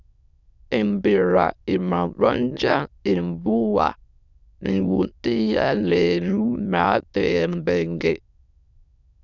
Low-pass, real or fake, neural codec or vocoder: 7.2 kHz; fake; autoencoder, 22.05 kHz, a latent of 192 numbers a frame, VITS, trained on many speakers